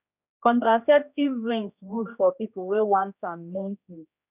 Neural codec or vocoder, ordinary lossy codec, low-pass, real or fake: codec, 16 kHz, 1 kbps, X-Codec, HuBERT features, trained on general audio; none; 3.6 kHz; fake